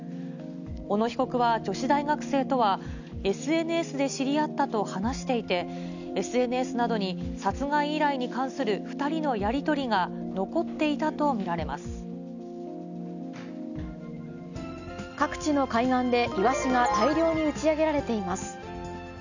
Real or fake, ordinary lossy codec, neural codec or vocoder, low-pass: real; none; none; 7.2 kHz